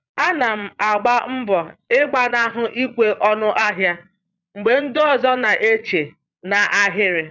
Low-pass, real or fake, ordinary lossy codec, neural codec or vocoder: 7.2 kHz; fake; none; vocoder, 22.05 kHz, 80 mel bands, Vocos